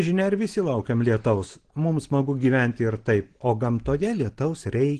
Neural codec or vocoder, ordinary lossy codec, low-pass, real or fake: none; Opus, 16 kbps; 9.9 kHz; real